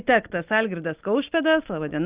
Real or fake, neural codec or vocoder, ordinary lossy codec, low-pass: real; none; Opus, 24 kbps; 3.6 kHz